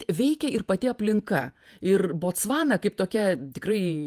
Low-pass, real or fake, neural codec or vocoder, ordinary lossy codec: 14.4 kHz; fake; vocoder, 48 kHz, 128 mel bands, Vocos; Opus, 32 kbps